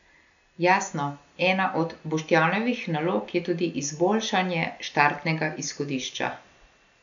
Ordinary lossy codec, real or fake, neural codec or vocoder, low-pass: none; real; none; 7.2 kHz